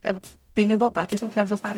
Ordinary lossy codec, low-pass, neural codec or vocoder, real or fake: MP3, 96 kbps; 19.8 kHz; codec, 44.1 kHz, 0.9 kbps, DAC; fake